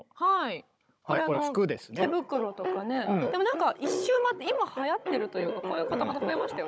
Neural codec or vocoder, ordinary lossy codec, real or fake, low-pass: codec, 16 kHz, 16 kbps, FunCodec, trained on Chinese and English, 50 frames a second; none; fake; none